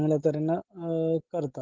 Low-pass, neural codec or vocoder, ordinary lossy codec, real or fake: 7.2 kHz; none; Opus, 16 kbps; real